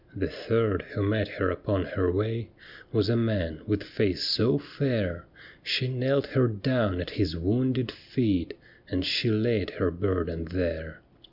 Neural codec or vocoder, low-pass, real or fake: none; 5.4 kHz; real